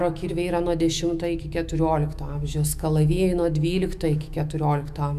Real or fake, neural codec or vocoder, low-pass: fake; autoencoder, 48 kHz, 128 numbers a frame, DAC-VAE, trained on Japanese speech; 14.4 kHz